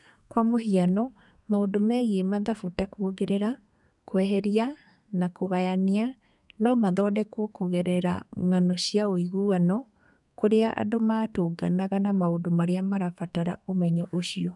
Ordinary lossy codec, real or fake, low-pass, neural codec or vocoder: none; fake; 10.8 kHz; codec, 32 kHz, 1.9 kbps, SNAC